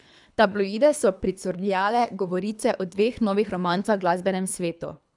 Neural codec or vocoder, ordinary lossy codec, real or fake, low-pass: codec, 24 kHz, 3 kbps, HILCodec; none; fake; 10.8 kHz